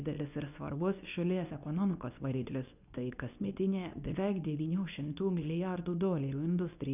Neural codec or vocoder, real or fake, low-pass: codec, 24 kHz, 0.9 kbps, WavTokenizer, medium speech release version 2; fake; 3.6 kHz